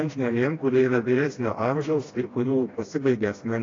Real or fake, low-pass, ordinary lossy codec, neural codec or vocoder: fake; 7.2 kHz; AAC, 32 kbps; codec, 16 kHz, 1 kbps, FreqCodec, smaller model